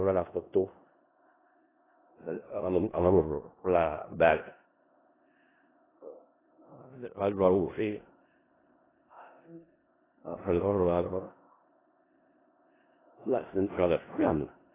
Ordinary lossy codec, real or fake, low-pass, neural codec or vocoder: AAC, 16 kbps; fake; 3.6 kHz; codec, 16 kHz in and 24 kHz out, 0.4 kbps, LongCat-Audio-Codec, four codebook decoder